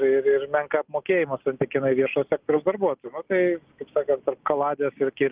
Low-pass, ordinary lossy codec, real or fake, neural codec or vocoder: 3.6 kHz; Opus, 32 kbps; real; none